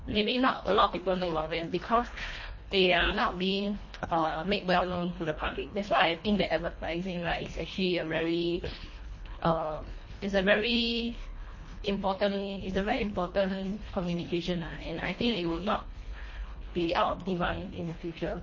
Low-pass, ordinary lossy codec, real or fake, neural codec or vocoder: 7.2 kHz; MP3, 32 kbps; fake; codec, 24 kHz, 1.5 kbps, HILCodec